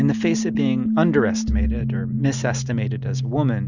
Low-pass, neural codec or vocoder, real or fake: 7.2 kHz; none; real